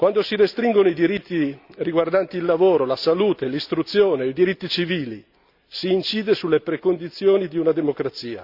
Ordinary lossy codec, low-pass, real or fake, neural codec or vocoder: Opus, 64 kbps; 5.4 kHz; real; none